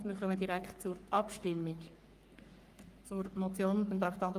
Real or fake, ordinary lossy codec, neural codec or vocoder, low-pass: fake; Opus, 32 kbps; codec, 44.1 kHz, 3.4 kbps, Pupu-Codec; 14.4 kHz